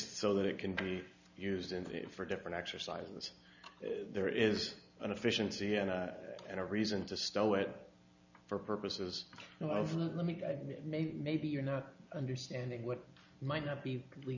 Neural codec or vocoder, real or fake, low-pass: none; real; 7.2 kHz